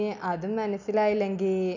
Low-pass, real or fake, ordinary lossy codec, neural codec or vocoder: 7.2 kHz; real; none; none